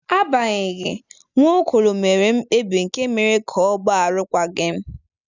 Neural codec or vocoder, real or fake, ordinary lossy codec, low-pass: none; real; none; 7.2 kHz